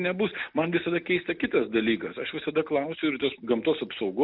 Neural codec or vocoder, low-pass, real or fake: none; 5.4 kHz; real